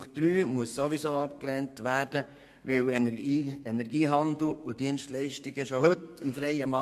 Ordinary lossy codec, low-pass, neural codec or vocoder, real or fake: MP3, 64 kbps; 14.4 kHz; codec, 32 kHz, 1.9 kbps, SNAC; fake